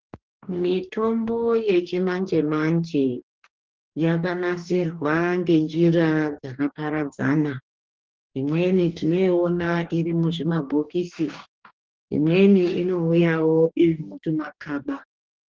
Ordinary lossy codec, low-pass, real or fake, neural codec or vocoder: Opus, 16 kbps; 7.2 kHz; fake; codec, 44.1 kHz, 2.6 kbps, DAC